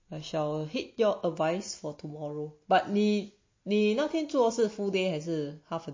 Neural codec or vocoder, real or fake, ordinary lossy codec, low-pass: none; real; MP3, 32 kbps; 7.2 kHz